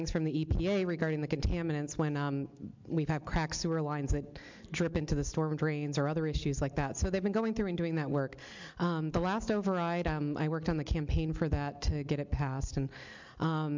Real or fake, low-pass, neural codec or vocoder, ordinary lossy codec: real; 7.2 kHz; none; MP3, 64 kbps